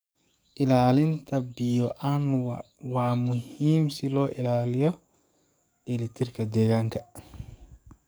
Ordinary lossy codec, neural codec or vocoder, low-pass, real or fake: none; codec, 44.1 kHz, 7.8 kbps, DAC; none; fake